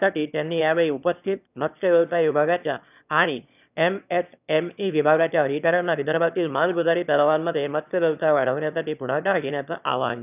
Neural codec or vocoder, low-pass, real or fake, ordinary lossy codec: autoencoder, 22.05 kHz, a latent of 192 numbers a frame, VITS, trained on one speaker; 3.6 kHz; fake; none